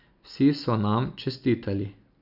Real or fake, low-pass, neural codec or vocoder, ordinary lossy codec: real; 5.4 kHz; none; none